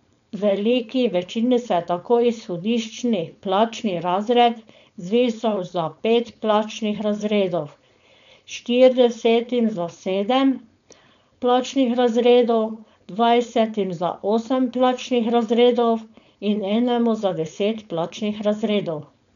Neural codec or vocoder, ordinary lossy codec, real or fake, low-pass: codec, 16 kHz, 4.8 kbps, FACodec; none; fake; 7.2 kHz